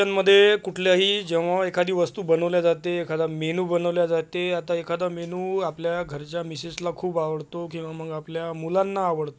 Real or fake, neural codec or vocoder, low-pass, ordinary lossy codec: real; none; none; none